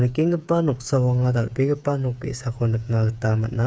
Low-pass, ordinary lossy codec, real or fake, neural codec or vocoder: none; none; fake; codec, 16 kHz, 8 kbps, FreqCodec, smaller model